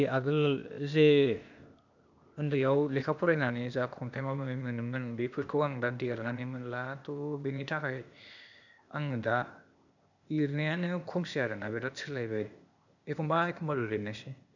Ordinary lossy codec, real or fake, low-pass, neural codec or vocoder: none; fake; 7.2 kHz; codec, 16 kHz, 0.8 kbps, ZipCodec